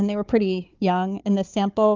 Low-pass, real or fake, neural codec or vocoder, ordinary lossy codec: 7.2 kHz; fake; codec, 16 kHz, 4 kbps, FunCodec, trained on Chinese and English, 50 frames a second; Opus, 24 kbps